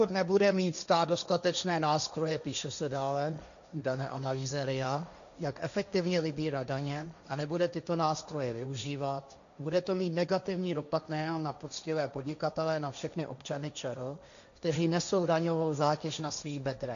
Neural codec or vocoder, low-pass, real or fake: codec, 16 kHz, 1.1 kbps, Voila-Tokenizer; 7.2 kHz; fake